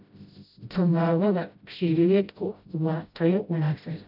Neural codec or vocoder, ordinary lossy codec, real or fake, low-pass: codec, 16 kHz, 0.5 kbps, FreqCodec, smaller model; AAC, 48 kbps; fake; 5.4 kHz